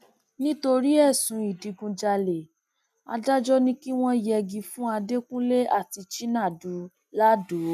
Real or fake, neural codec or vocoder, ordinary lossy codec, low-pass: real; none; none; 14.4 kHz